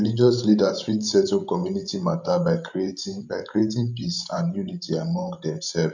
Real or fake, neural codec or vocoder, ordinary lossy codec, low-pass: fake; codec, 16 kHz, 16 kbps, FreqCodec, larger model; none; 7.2 kHz